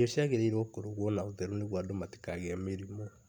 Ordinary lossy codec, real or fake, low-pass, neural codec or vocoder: none; real; 19.8 kHz; none